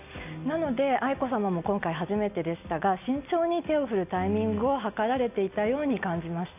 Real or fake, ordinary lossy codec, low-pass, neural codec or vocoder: real; AAC, 32 kbps; 3.6 kHz; none